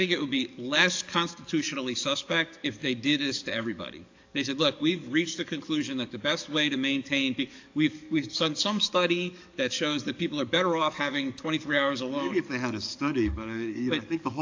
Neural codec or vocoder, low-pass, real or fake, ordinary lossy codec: codec, 44.1 kHz, 7.8 kbps, DAC; 7.2 kHz; fake; AAC, 48 kbps